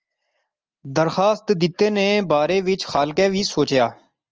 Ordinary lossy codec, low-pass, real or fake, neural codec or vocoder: Opus, 24 kbps; 7.2 kHz; real; none